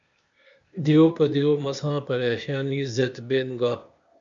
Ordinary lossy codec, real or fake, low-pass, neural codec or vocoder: MP3, 64 kbps; fake; 7.2 kHz; codec, 16 kHz, 0.8 kbps, ZipCodec